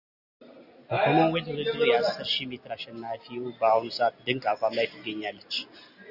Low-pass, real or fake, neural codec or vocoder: 5.4 kHz; real; none